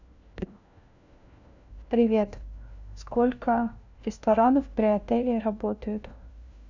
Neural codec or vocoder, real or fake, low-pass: codec, 16 kHz, 1 kbps, FunCodec, trained on LibriTTS, 50 frames a second; fake; 7.2 kHz